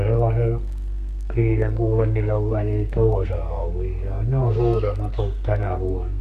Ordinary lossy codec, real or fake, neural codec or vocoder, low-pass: none; fake; codec, 32 kHz, 1.9 kbps, SNAC; 14.4 kHz